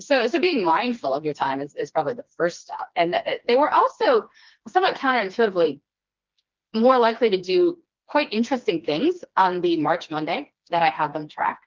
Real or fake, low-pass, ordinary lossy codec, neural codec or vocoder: fake; 7.2 kHz; Opus, 32 kbps; codec, 16 kHz, 2 kbps, FreqCodec, smaller model